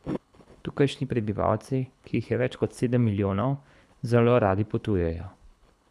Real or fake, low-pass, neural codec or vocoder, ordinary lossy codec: fake; none; codec, 24 kHz, 6 kbps, HILCodec; none